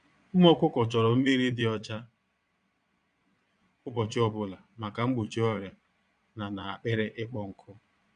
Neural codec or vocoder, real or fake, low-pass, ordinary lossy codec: vocoder, 22.05 kHz, 80 mel bands, Vocos; fake; 9.9 kHz; none